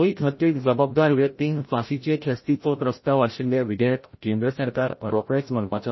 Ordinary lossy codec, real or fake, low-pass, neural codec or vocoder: MP3, 24 kbps; fake; 7.2 kHz; codec, 16 kHz, 0.5 kbps, FreqCodec, larger model